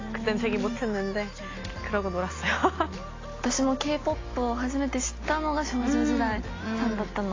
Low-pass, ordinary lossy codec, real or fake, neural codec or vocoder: 7.2 kHz; AAC, 32 kbps; real; none